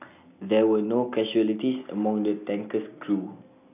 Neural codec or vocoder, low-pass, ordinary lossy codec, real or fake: none; 3.6 kHz; none; real